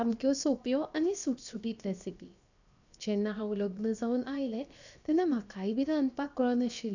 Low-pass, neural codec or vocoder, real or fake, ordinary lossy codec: 7.2 kHz; codec, 16 kHz, 0.7 kbps, FocalCodec; fake; none